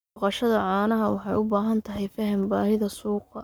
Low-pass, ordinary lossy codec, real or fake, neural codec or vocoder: none; none; fake; codec, 44.1 kHz, 7.8 kbps, Pupu-Codec